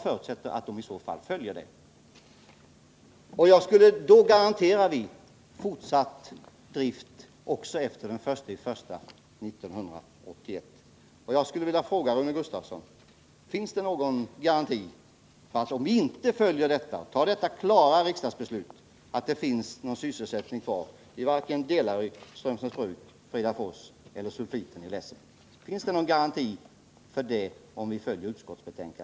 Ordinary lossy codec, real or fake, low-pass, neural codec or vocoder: none; real; none; none